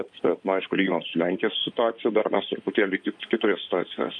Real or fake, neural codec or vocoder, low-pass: fake; codec, 16 kHz in and 24 kHz out, 2.2 kbps, FireRedTTS-2 codec; 9.9 kHz